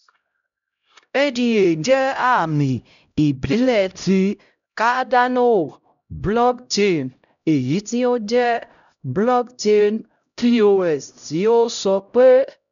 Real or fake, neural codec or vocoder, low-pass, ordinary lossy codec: fake; codec, 16 kHz, 0.5 kbps, X-Codec, HuBERT features, trained on LibriSpeech; 7.2 kHz; none